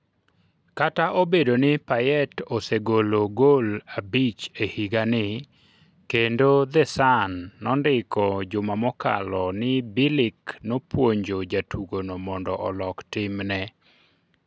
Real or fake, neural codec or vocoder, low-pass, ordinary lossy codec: real; none; none; none